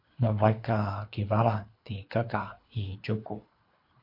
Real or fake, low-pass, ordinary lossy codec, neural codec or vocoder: fake; 5.4 kHz; MP3, 32 kbps; codec, 24 kHz, 3 kbps, HILCodec